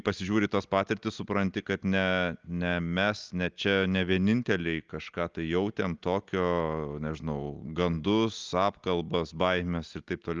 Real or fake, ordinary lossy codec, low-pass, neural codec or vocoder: real; Opus, 24 kbps; 7.2 kHz; none